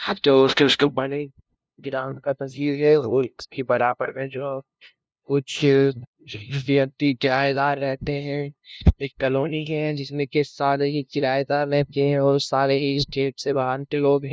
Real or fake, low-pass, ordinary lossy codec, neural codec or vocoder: fake; none; none; codec, 16 kHz, 0.5 kbps, FunCodec, trained on LibriTTS, 25 frames a second